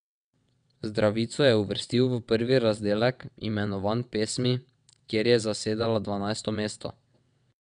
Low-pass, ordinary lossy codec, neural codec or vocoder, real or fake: 9.9 kHz; none; vocoder, 22.05 kHz, 80 mel bands, WaveNeXt; fake